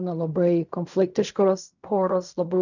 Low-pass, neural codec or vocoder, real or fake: 7.2 kHz; codec, 16 kHz in and 24 kHz out, 0.4 kbps, LongCat-Audio-Codec, fine tuned four codebook decoder; fake